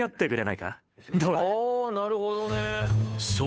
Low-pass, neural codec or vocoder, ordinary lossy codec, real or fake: none; codec, 16 kHz, 2 kbps, FunCodec, trained on Chinese and English, 25 frames a second; none; fake